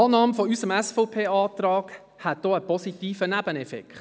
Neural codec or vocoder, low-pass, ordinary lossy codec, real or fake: none; none; none; real